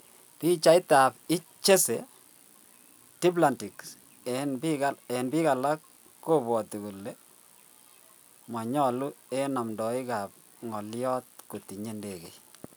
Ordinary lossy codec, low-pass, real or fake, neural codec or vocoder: none; none; real; none